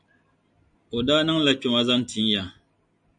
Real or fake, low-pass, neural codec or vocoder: real; 9.9 kHz; none